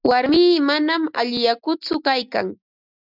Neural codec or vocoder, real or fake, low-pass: none; real; 5.4 kHz